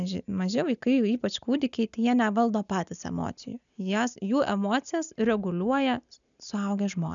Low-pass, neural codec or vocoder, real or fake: 7.2 kHz; none; real